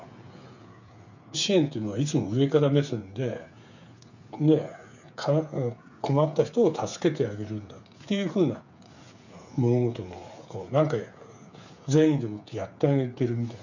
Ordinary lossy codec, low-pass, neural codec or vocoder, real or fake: none; 7.2 kHz; codec, 16 kHz, 8 kbps, FreqCodec, smaller model; fake